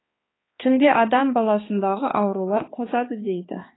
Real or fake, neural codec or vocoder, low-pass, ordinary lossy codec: fake; codec, 16 kHz, 2 kbps, X-Codec, HuBERT features, trained on balanced general audio; 7.2 kHz; AAC, 16 kbps